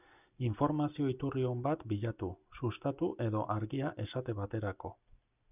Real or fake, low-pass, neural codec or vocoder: real; 3.6 kHz; none